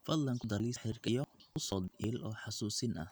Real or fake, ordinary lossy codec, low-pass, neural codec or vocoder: real; none; none; none